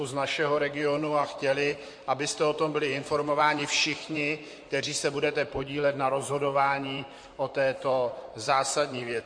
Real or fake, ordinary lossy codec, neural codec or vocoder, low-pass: fake; MP3, 48 kbps; vocoder, 44.1 kHz, 128 mel bands, Pupu-Vocoder; 9.9 kHz